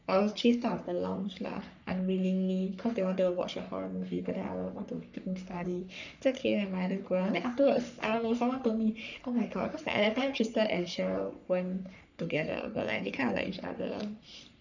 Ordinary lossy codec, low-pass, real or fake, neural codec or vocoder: none; 7.2 kHz; fake; codec, 44.1 kHz, 3.4 kbps, Pupu-Codec